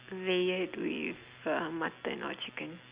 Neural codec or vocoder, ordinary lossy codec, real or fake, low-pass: none; Opus, 64 kbps; real; 3.6 kHz